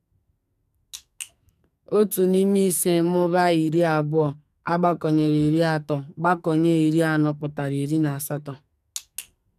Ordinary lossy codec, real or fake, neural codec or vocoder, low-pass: none; fake; codec, 44.1 kHz, 2.6 kbps, SNAC; 14.4 kHz